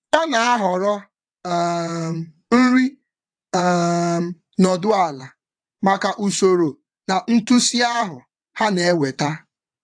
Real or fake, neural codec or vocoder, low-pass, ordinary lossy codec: fake; vocoder, 22.05 kHz, 80 mel bands, WaveNeXt; 9.9 kHz; AAC, 64 kbps